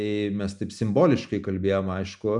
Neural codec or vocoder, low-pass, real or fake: none; 10.8 kHz; real